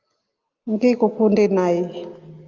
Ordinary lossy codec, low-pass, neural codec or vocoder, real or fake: Opus, 24 kbps; 7.2 kHz; none; real